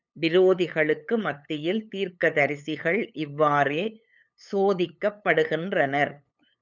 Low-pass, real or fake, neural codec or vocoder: 7.2 kHz; fake; codec, 16 kHz, 8 kbps, FunCodec, trained on LibriTTS, 25 frames a second